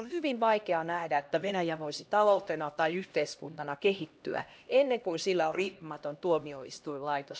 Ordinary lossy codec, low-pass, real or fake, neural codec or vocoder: none; none; fake; codec, 16 kHz, 1 kbps, X-Codec, HuBERT features, trained on LibriSpeech